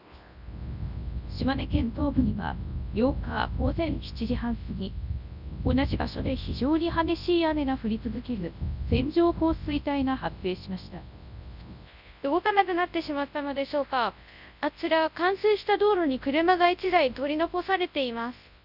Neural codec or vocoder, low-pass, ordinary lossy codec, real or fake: codec, 24 kHz, 0.9 kbps, WavTokenizer, large speech release; 5.4 kHz; none; fake